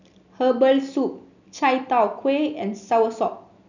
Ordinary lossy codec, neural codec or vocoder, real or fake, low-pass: none; none; real; 7.2 kHz